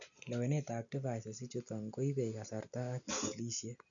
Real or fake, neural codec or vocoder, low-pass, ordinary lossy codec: real; none; 7.2 kHz; none